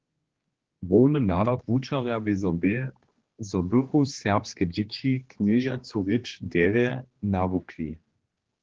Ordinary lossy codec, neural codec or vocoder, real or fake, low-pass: Opus, 16 kbps; codec, 16 kHz, 2 kbps, X-Codec, HuBERT features, trained on general audio; fake; 7.2 kHz